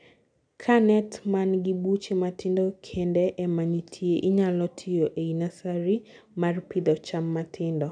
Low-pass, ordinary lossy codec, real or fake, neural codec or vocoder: 9.9 kHz; none; real; none